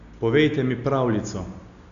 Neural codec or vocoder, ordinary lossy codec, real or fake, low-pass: none; none; real; 7.2 kHz